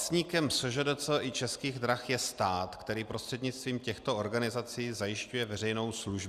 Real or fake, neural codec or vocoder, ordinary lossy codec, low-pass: real; none; Opus, 64 kbps; 14.4 kHz